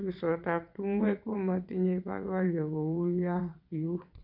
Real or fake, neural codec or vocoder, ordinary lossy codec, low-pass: fake; vocoder, 22.05 kHz, 80 mel bands, WaveNeXt; none; 5.4 kHz